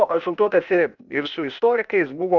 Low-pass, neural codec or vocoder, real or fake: 7.2 kHz; codec, 16 kHz, 0.8 kbps, ZipCodec; fake